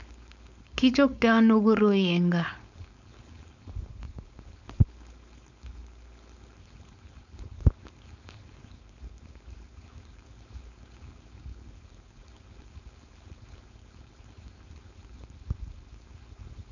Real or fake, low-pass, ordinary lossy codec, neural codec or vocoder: fake; 7.2 kHz; none; codec, 16 kHz, 4.8 kbps, FACodec